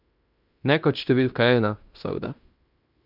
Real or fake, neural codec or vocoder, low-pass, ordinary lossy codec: fake; codec, 16 kHz in and 24 kHz out, 0.9 kbps, LongCat-Audio-Codec, fine tuned four codebook decoder; 5.4 kHz; none